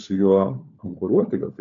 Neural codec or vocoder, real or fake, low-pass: codec, 16 kHz, 4 kbps, FunCodec, trained on LibriTTS, 50 frames a second; fake; 7.2 kHz